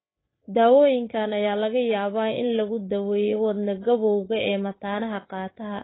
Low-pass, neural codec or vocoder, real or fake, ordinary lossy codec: 7.2 kHz; codec, 16 kHz, 8 kbps, FreqCodec, larger model; fake; AAC, 16 kbps